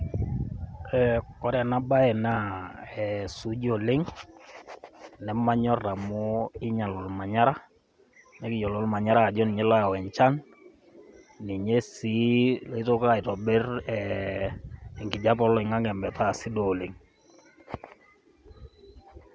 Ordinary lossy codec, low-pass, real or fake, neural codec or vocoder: none; none; real; none